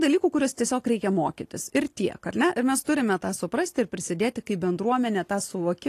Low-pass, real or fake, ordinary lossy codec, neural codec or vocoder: 14.4 kHz; real; AAC, 48 kbps; none